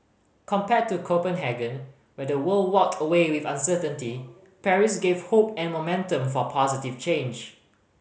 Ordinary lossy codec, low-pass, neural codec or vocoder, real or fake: none; none; none; real